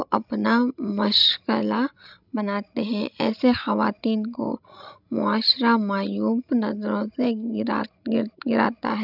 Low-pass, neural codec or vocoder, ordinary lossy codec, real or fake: 5.4 kHz; codec, 16 kHz, 16 kbps, FreqCodec, larger model; none; fake